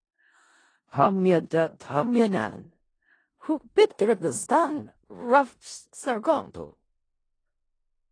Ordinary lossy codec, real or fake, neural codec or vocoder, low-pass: AAC, 32 kbps; fake; codec, 16 kHz in and 24 kHz out, 0.4 kbps, LongCat-Audio-Codec, four codebook decoder; 9.9 kHz